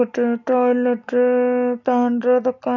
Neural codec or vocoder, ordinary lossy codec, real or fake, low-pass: none; none; real; 7.2 kHz